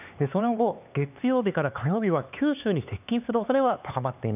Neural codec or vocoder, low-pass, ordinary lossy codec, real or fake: codec, 16 kHz, 4 kbps, X-Codec, HuBERT features, trained on LibriSpeech; 3.6 kHz; none; fake